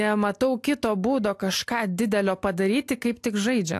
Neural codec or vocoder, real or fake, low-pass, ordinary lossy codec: none; real; 14.4 kHz; AAC, 64 kbps